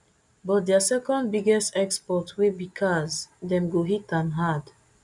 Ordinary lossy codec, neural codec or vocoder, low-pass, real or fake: none; none; 10.8 kHz; real